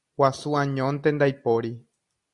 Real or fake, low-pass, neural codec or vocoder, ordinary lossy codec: real; 10.8 kHz; none; Opus, 64 kbps